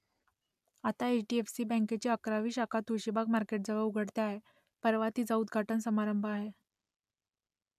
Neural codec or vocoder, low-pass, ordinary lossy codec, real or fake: none; 14.4 kHz; none; real